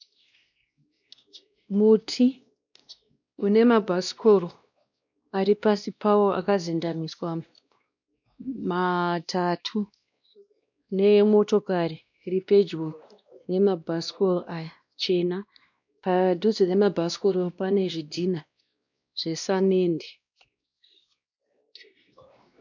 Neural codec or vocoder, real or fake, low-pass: codec, 16 kHz, 1 kbps, X-Codec, WavLM features, trained on Multilingual LibriSpeech; fake; 7.2 kHz